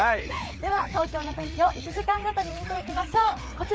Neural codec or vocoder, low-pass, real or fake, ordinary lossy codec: codec, 16 kHz, 4 kbps, FreqCodec, larger model; none; fake; none